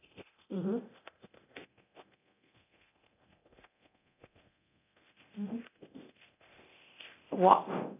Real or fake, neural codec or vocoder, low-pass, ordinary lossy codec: fake; codec, 24 kHz, 0.9 kbps, DualCodec; 3.6 kHz; none